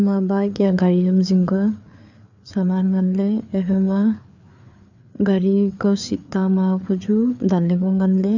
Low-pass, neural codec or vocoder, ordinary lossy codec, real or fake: 7.2 kHz; codec, 16 kHz, 4 kbps, FreqCodec, larger model; none; fake